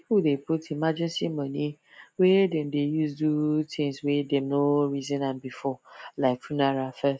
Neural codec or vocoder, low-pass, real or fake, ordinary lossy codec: none; none; real; none